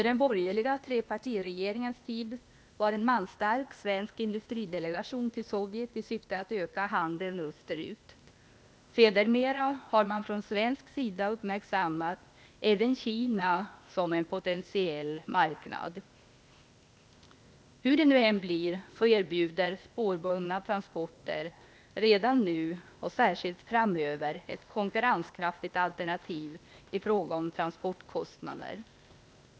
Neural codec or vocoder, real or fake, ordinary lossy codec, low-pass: codec, 16 kHz, 0.8 kbps, ZipCodec; fake; none; none